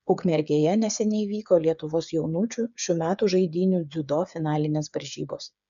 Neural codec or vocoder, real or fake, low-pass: codec, 16 kHz, 8 kbps, FreqCodec, smaller model; fake; 7.2 kHz